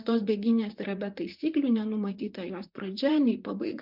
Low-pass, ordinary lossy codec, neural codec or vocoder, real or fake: 5.4 kHz; MP3, 48 kbps; vocoder, 44.1 kHz, 128 mel bands, Pupu-Vocoder; fake